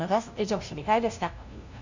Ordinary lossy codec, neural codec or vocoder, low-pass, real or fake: Opus, 64 kbps; codec, 16 kHz, 0.5 kbps, FunCodec, trained on LibriTTS, 25 frames a second; 7.2 kHz; fake